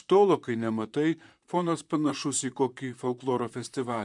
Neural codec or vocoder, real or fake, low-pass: vocoder, 44.1 kHz, 128 mel bands, Pupu-Vocoder; fake; 10.8 kHz